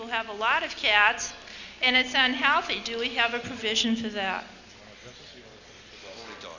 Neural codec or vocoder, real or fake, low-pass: none; real; 7.2 kHz